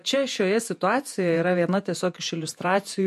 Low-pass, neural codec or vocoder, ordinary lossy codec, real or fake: 14.4 kHz; vocoder, 48 kHz, 128 mel bands, Vocos; MP3, 64 kbps; fake